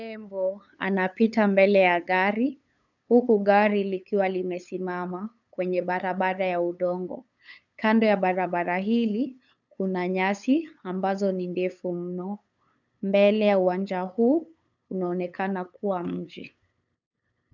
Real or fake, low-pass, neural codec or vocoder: fake; 7.2 kHz; codec, 16 kHz, 8 kbps, FunCodec, trained on LibriTTS, 25 frames a second